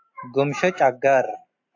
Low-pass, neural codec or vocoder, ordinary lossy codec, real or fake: 7.2 kHz; none; AAC, 48 kbps; real